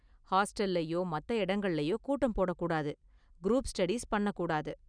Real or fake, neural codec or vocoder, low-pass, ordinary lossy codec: real; none; 9.9 kHz; none